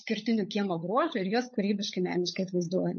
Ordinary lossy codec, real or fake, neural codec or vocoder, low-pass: MP3, 32 kbps; fake; codec, 16 kHz, 16 kbps, FunCodec, trained on LibriTTS, 50 frames a second; 7.2 kHz